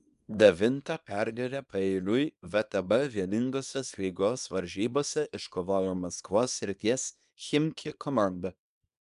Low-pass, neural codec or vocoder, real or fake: 10.8 kHz; codec, 24 kHz, 0.9 kbps, WavTokenizer, small release; fake